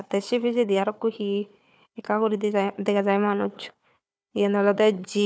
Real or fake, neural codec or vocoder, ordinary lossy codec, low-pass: fake; codec, 16 kHz, 4 kbps, FunCodec, trained on Chinese and English, 50 frames a second; none; none